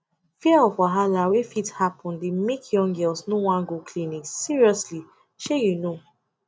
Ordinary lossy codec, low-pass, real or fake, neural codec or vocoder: none; none; real; none